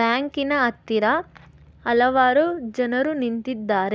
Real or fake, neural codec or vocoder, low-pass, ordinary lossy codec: real; none; none; none